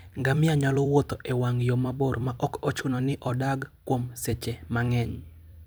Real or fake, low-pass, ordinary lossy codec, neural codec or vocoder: fake; none; none; vocoder, 44.1 kHz, 128 mel bands every 256 samples, BigVGAN v2